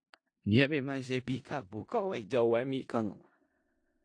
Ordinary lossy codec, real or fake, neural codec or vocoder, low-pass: AAC, 48 kbps; fake; codec, 16 kHz in and 24 kHz out, 0.4 kbps, LongCat-Audio-Codec, four codebook decoder; 9.9 kHz